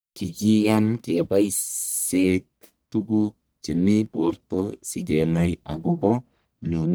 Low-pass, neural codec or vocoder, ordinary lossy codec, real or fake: none; codec, 44.1 kHz, 1.7 kbps, Pupu-Codec; none; fake